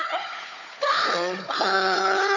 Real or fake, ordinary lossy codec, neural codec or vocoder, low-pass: fake; none; codec, 16 kHz, 4 kbps, FunCodec, trained on Chinese and English, 50 frames a second; 7.2 kHz